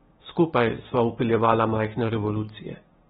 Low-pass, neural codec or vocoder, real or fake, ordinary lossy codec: 7.2 kHz; codec, 16 kHz, 6 kbps, DAC; fake; AAC, 16 kbps